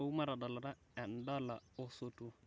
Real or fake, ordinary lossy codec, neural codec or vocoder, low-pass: real; none; none; none